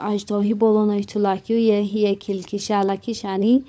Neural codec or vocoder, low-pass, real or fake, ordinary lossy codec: codec, 16 kHz, 16 kbps, FunCodec, trained on LibriTTS, 50 frames a second; none; fake; none